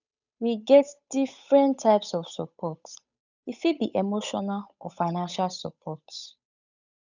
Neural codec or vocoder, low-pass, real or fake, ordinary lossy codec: codec, 16 kHz, 8 kbps, FunCodec, trained on Chinese and English, 25 frames a second; 7.2 kHz; fake; none